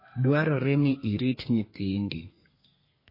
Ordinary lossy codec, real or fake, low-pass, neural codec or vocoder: MP3, 24 kbps; fake; 5.4 kHz; codec, 32 kHz, 1.9 kbps, SNAC